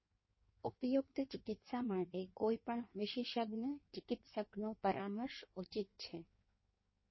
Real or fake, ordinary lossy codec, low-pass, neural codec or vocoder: fake; MP3, 24 kbps; 7.2 kHz; codec, 16 kHz in and 24 kHz out, 1.1 kbps, FireRedTTS-2 codec